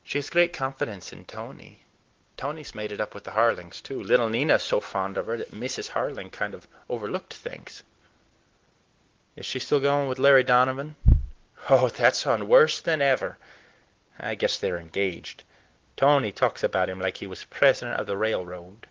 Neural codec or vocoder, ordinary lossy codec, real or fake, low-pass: none; Opus, 16 kbps; real; 7.2 kHz